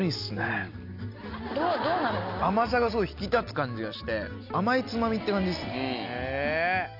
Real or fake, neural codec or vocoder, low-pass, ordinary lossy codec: real; none; 5.4 kHz; none